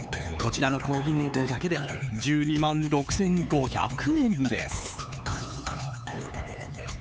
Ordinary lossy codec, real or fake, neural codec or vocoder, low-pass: none; fake; codec, 16 kHz, 4 kbps, X-Codec, HuBERT features, trained on LibriSpeech; none